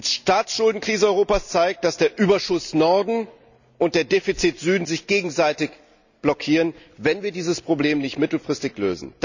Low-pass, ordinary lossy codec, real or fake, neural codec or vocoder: 7.2 kHz; none; real; none